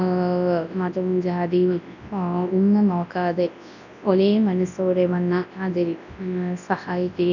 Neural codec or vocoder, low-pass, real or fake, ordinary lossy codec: codec, 24 kHz, 0.9 kbps, WavTokenizer, large speech release; 7.2 kHz; fake; none